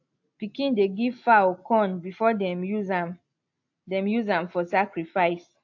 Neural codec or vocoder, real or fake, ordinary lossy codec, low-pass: none; real; AAC, 48 kbps; 7.2 kHz